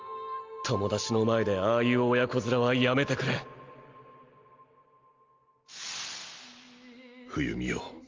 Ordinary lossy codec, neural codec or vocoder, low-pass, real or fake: Opus, 32 kbps; none; 7.2 kHz; real